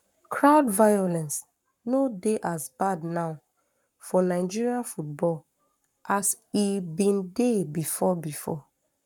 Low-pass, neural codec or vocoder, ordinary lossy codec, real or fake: 19.8 kHz; codec, 44.1 kHz, 7.8 kbps, Pupu-Codec; none; fake